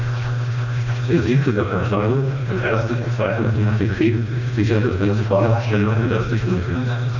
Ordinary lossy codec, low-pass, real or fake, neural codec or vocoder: none; 7.2 kHz; fake; codec, 16 kHz, 1 kbps, FreqCodec, smaller model